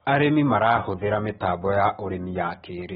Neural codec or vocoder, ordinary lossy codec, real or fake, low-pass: none; AAC, 16 kbps; real; 7.2 kHz